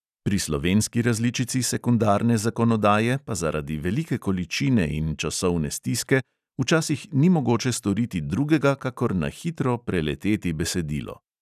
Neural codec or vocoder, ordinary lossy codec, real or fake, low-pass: none; none; real; 14.4 kHz